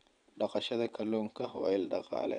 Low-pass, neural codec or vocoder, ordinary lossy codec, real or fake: 9.9 kHz; vocoder, 22.05 kHz, 80 mel bands, WaveNeXt; none; fake